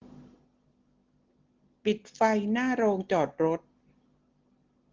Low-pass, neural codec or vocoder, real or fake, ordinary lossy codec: 7.2 kHz; none; real; Opus, 16 kbps